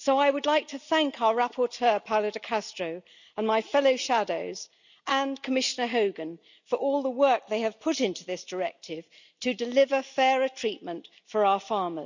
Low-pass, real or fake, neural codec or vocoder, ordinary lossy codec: 7.2 kHz; real; none; none